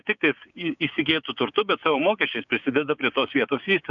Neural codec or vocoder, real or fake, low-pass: codec, 16 kHz, 6 kbps, DAC; fake; 7.2 kHz